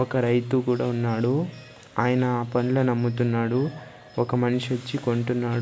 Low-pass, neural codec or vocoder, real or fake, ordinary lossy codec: none; none; real; none